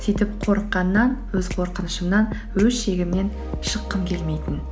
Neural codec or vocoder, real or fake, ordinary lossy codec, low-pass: none; real; none; none